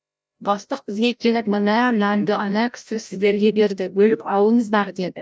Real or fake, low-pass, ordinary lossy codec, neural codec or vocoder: fake; none; none; codec, 16 kHz, 0.5 kbps, FreqCodec, larger model